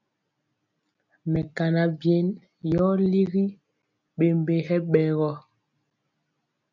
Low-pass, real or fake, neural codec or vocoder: 7.2 kHz; real; none